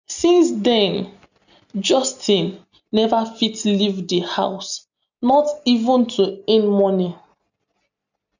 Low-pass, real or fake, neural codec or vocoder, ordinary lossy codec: 7.2 kHz; real; none; none